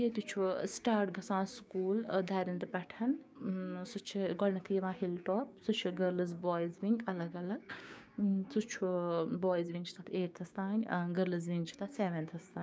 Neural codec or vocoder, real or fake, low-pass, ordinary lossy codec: codec, 16 kHz, 6 kbps, DAC; fake; none; none